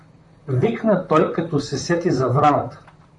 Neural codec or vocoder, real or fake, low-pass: vocoder, 44.1 kHz, 128 mel bands, Pupu-Vocoder; fake; 10.8 kHz